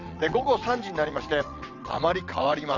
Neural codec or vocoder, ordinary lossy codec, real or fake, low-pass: vocoder, 22.05 kHz, 80 mel bands, WaveNeXt; none; fake; 7.2 kHz